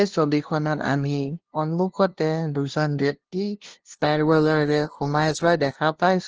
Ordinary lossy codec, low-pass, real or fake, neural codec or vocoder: Opus, 16 kbps; 7.2 kHz; fake; codec, 16 kHz, 0.5 kbps, FunCodec, trained on LibriTTS, 25 frames a second